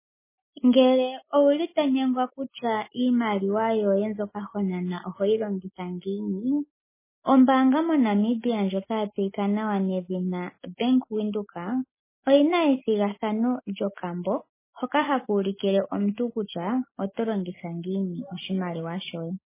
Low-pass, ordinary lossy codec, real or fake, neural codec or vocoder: 3.6 kHz; MP3, 16 kbps; real; none